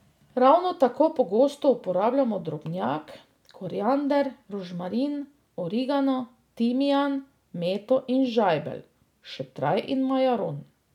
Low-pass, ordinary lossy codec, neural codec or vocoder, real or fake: 19.8 kHz; none; none; real